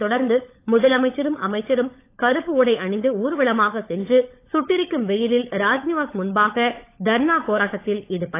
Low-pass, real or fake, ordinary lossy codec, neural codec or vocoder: 3.6 kHz; fake; AAC, 24 kbps; codec, 16 kHz, 16 kbps, FunCodec, trained on LibriTTS, 50 frames a second